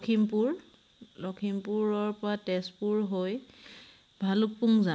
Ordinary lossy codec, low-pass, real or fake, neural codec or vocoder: none; none; real; none